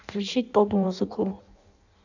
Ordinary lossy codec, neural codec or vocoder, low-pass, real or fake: none; codec, 16 kHz in and 24 kHz out, 0.6 kbps, FireRedTTS-2 codec; 7.2 kHz; fake